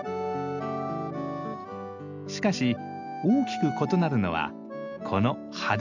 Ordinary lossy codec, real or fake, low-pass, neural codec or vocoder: none; real; 7.2 kHz; none